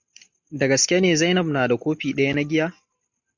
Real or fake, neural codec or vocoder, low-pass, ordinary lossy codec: real; none; 7.2 kHz; MP3, 64 kbps